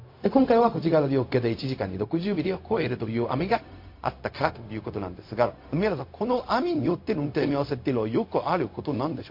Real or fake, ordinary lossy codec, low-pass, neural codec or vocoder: fake; MP3, 32 kbps; 5.4 kHz; codec, 16 kHz, 0.4 kbps, LongCat-Audio-Codec